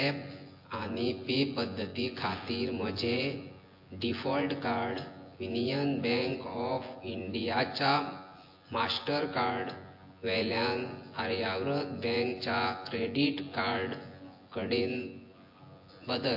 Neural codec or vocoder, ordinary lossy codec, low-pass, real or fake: vocoder, 24 kHz, 100 mel bands, Vocos; MP3, 32 kbps; 5.4 kHz; fake